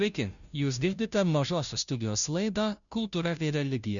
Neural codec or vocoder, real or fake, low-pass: codec, 16 kHz, 0.5 kbps, FunCodec, trained on Chinese and English, 25 frames a second; fake; 7.2 kHz